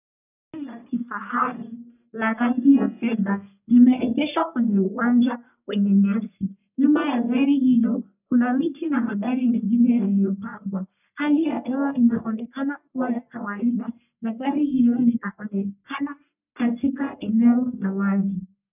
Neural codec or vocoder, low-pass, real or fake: codec, 44.1 kHz, 1.7 kbps, Pupu-Codec; 3.6 kHz; fake